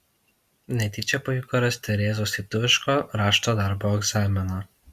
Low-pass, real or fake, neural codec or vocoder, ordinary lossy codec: 14.4 kHz; real; none; Opus, 64 kbps